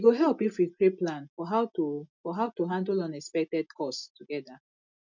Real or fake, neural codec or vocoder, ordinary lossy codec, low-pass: real; none; none; 7.2 kHz